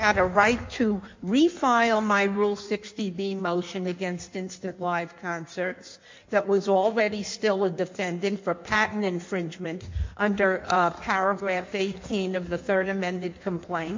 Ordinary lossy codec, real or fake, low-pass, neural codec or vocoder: MP3, 48 kbps; fake; 7.2 kHz; codec, 16 kHz in and 24 kHz out, 1.1 kbps, FireRedTTS-2 codec